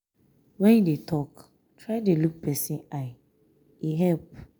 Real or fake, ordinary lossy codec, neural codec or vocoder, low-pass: real; none; none; none